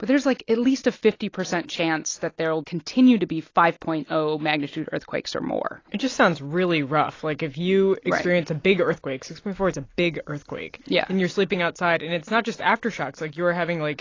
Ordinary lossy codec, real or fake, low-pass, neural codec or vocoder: AAC, 32 kbps; real; 7.2 kHz; none